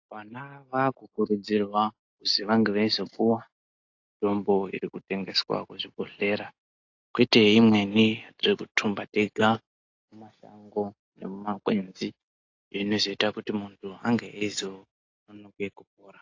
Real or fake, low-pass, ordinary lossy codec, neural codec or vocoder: real; 7.2 kHz; AAC, 48 kbps; none